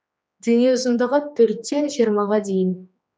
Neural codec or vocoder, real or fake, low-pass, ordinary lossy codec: codec, 16 kHz, 2 kbps, X-Codec, HuBERT features, trained on general audio; fake; none; none